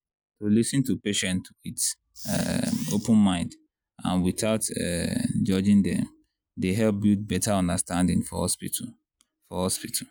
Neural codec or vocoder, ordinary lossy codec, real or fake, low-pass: none; none; real; none